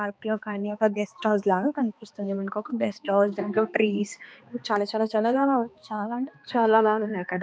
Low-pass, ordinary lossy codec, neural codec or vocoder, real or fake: none; none; codec, 16 kHz, 2 kbps, X-Codec, HuBERT features, trained on balanced general audio; fake